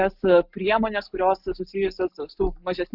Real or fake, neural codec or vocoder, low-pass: real; none; 5.4 kHz